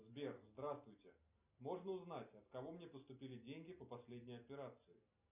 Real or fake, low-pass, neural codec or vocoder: real; 3.6 kHz; none